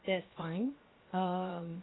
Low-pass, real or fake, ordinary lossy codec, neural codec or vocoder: 7.2 kHz; fake; AAC, 16 kbps; codec, 16 kHz, 0.7 kbps, FocalCodec